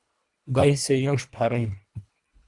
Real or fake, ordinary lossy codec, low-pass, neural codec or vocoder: fake; Opus, 64 kbps; 10.8 kHz; codec, 24 kHz, 1.5 kbps, HILCodec